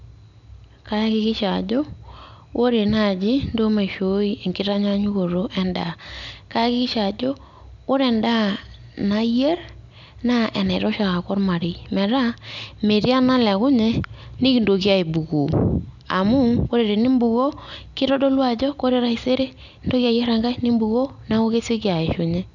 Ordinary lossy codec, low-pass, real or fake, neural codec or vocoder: none; 7.2 kHz; real; none